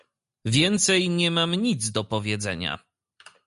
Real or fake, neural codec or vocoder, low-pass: real; none; 10.8 kHz